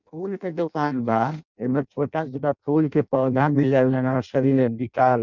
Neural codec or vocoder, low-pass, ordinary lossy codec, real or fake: codec, 16 kHz in and 24 kHz out, 0.6 kbps, FireRedTTS-2 codec; 7.2 kHz; none; fake